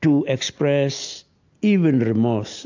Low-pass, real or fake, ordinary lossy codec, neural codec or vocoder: 7.2 kHz; real; AAC, 48 kbps; none